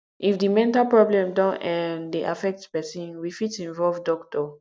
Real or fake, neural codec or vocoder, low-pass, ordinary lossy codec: real; none; none; none